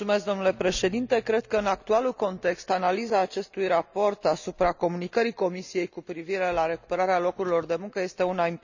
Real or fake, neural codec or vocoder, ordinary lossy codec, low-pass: real; none; none; 7.2 kHz